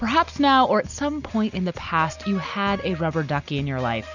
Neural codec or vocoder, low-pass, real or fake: none; 7.2 kHz; real